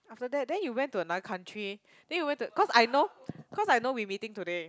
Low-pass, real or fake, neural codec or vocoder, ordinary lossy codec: none; real; none; none